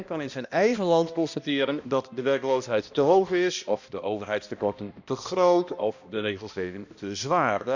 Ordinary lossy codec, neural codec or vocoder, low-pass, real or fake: none; codec, 16 kHz, 1 kbps, X-Codec, HuBERT features, trained on balanced general audio; 7.2 kHz; fake